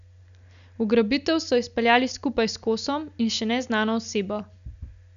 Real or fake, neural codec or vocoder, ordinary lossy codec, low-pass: real; none; none; 7.2 kHz